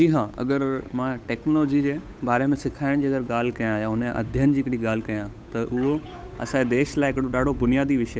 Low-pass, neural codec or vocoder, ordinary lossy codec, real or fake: none; codec, 16 kHz, 8 kbps, FunCodec, trained on Chinese and English, 25 frames a second; none; fake